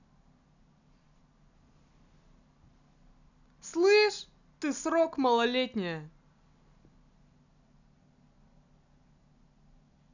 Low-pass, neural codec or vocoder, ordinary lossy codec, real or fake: 7.2 kHz; none; none; real